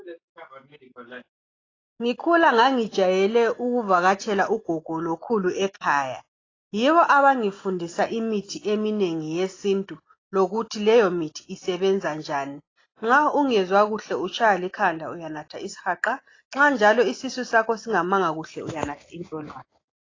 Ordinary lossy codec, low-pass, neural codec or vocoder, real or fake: AAC, 32 kbps; 7.2 kHz; none; real